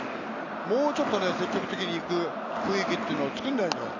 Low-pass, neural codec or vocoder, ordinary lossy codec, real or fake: 7.2 kHz; none; none; real